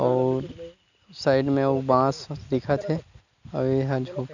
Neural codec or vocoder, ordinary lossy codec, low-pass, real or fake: none; none; 7.2 kHz; real